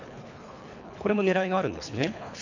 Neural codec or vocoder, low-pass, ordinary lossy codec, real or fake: codec, 24 kHz, 3 kbps, HILCodec; 7.2 kHz; none; fake